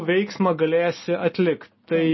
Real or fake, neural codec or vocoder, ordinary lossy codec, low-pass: real; none; MP3, 24 kbps; 7.2 kHz